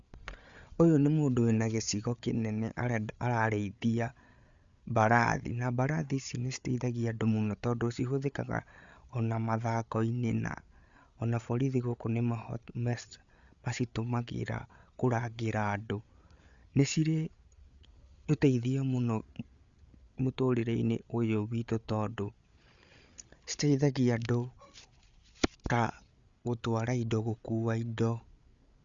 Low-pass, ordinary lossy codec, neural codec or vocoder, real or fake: 7.2 kHz; Opus, 64 kbps; codec, 16 kHz, 8 kbps, FreqCodec, larger model; fake